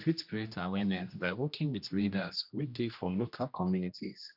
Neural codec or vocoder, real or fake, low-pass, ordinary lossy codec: codec, 16 kHz, 1 kbps, X-Codec, HuBERT features, trained on general audio; fake; 5.4 kHz; none